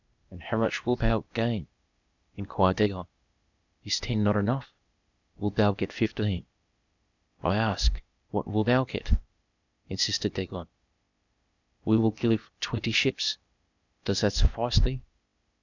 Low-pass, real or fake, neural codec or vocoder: 7.2 kHz; fake; codec, 16 kHz, 0.8 kbps, ZipCodec